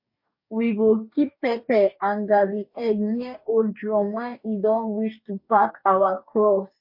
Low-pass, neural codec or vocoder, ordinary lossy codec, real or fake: 5.4 kHz; codec, 44.1 kHz, 2.6 kbps, DAC; MP3, 32 kbps; fake